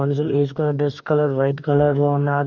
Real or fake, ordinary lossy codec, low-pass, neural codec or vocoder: fake; none; 7.2 kHz; codec, 44.1 kHz, 2.6 kbps, DAC